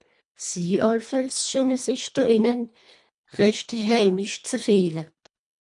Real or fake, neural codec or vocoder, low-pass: fake; codec, 24 kHz, 1.5 kbps, HILCodec; 10.8 kHz